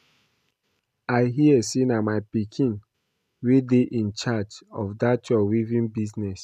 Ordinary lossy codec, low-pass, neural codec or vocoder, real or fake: none; 14.4 kHz; none; real